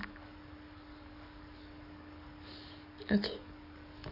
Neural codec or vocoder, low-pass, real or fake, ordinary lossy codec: codec, 44.1 kHz, 7.8 kbps, DAC; 5.4 kHz; fake; none